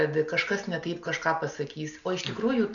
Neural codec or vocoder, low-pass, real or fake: none; 7.2 kHz; real